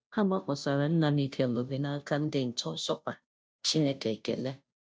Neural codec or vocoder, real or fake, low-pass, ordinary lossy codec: codec, 16 kHz, 0.5 kbps, FunCodec, trained on Chinese and English, 25 frames a second; fake; none; none